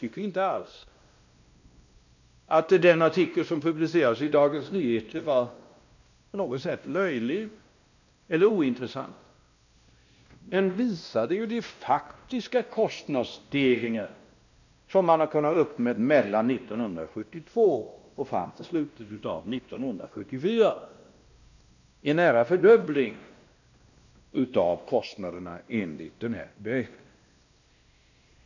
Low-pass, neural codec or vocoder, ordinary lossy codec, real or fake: 7.2 kHz; codec, 16 kHz, 1 kbps, X-Codec, WavLM features, trained on Multilingual LibriSpeech; none; fake